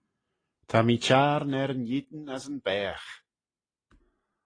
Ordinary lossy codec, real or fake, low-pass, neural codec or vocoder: AAC, 32 kbps; real; 9.9 kHz; none